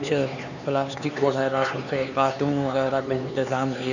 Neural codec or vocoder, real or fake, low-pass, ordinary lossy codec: codec, 16 kHz, 4 kbps, X-Codec, HuBERT features, trained on LibriSpeech; fake; 7.2 kHz; none